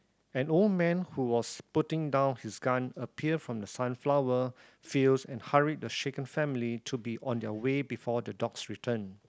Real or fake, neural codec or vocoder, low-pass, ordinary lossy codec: real; none; none; none